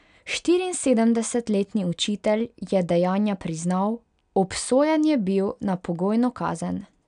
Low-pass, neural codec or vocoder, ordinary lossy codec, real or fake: 9.9 kHz; none; none; real